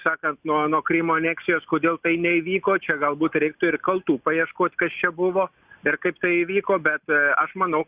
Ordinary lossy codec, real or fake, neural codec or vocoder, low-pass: Opus, 64 kbps; real; none; 3.6 kHz